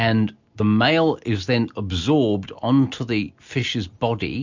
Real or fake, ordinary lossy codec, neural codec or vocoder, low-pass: real; MP3, 64 kbps; none; 7.2 kHz